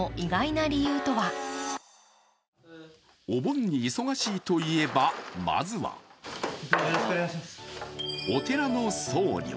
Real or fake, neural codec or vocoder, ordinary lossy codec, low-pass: real; none; none; none